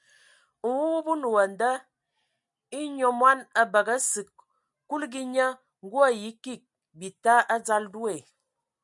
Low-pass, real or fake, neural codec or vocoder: 10.8 kHz; real; none